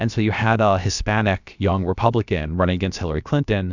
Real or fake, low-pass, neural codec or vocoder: fake; 7.2 kHz; codec, 16 kHz, about 1 kbps, DyCAST, with the encoder's durations